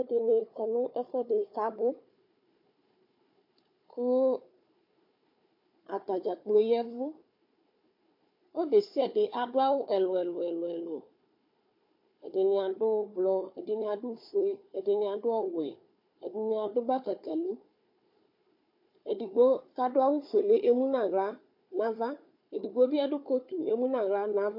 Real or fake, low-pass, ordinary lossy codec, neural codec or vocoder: fake; 5.4 kHz; MP3, 32 kbps; codec, 16 kHz, 4 kbps, FunCodec, trained on Chinese and English, 50 frames a second